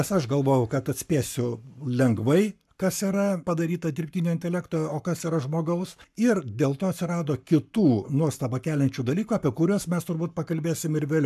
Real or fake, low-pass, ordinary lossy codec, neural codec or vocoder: fake; 14.4 kHz; AAC, 96 kbps; codec, 44.1 kHz, 7.8 kbps, Pupu-Codec